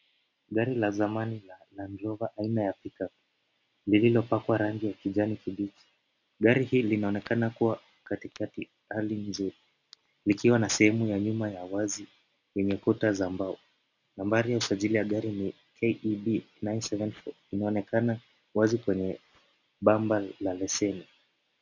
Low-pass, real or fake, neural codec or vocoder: 7.2 kHz; real; none